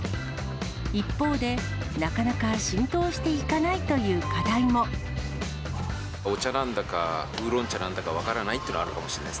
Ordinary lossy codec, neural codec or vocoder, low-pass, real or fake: none; none; none; real